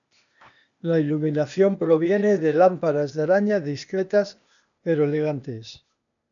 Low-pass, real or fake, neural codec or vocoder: 7.2 kHz; fake; codec, 16 kHz, 0.8 kbps, ZipCodec